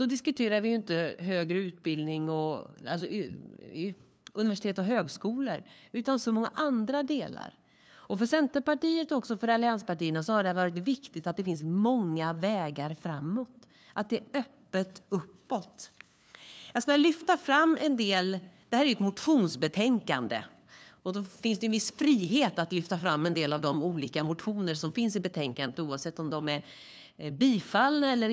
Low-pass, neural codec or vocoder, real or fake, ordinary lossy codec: none; codec, 16 kHz, 2 kbps, FunCodec, trained on LibriTTS, 25 frames a second; fake; none